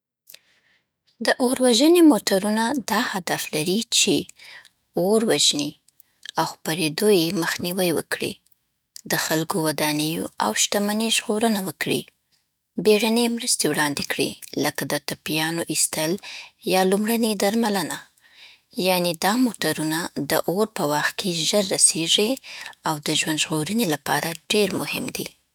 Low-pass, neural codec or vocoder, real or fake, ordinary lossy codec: none; autoencoder, 48 kHz, 128 numbers a frame, DAC-VAE, trained on Japanese speech; fake; none